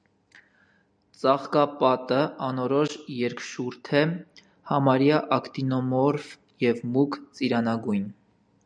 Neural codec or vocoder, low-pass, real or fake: none; 9.9 kHz; real